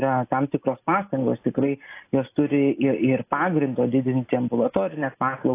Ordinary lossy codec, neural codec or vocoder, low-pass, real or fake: AAC, 24 kbps; none; 3.6 kHz; real